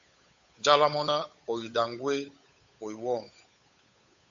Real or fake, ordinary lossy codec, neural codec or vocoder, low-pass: fake; AAC, 64 kbps; codec, 16 kHz, 8 kbps, FunCodec, trained on Chinese and English, 25 frames a second; 7.2 kHz